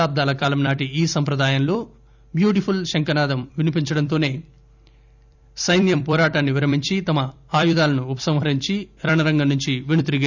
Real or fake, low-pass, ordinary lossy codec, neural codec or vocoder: fake; 7.2 kHz; none; vocoder, 44.1 kHz, 128 mel bands every 256 samples, BigVGAN v2